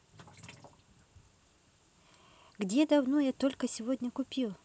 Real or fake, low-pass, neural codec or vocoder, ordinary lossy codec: real; none; none; none